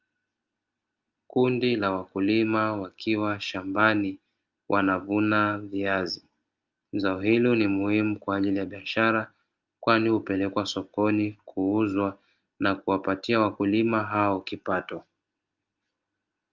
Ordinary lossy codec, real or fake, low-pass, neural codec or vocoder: Opus, 32 kbps; real; 7.2 kHz; none